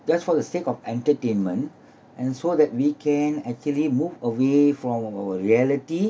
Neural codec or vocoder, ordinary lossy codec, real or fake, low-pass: none; none; real; none